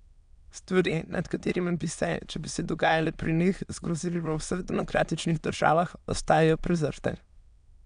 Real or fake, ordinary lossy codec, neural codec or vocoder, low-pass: fake; none; autoencoder, 22.05 kHz, a latent of 192 numbers a frame, VITS, trained on many speakers; 9.9 kHz